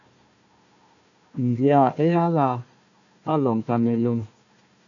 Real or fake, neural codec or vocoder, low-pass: fake; codec, 16 kHz, 1 kbps, FunCodec, trained on Chinese and English, 50 frames a second; 7.2 kHz